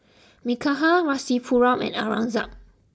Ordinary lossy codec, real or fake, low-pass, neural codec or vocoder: none; real; none; none